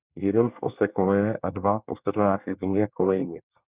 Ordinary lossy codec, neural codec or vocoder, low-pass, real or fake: Opus, 64 kbps; codec, 24 kHz, 1 kbps, SNAC; 3.6 kHz; fake